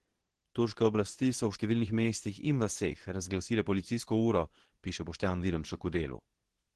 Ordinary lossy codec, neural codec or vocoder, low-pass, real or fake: Opus, 16 kbps; codec, 24 kHz, 0.9 kbps, WavTokenizer, medium speech release version 2; 10.8 kHz; fake